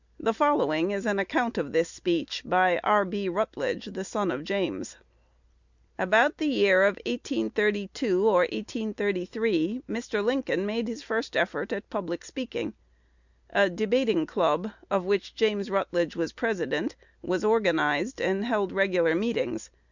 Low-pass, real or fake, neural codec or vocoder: 7.2 kHz; real; none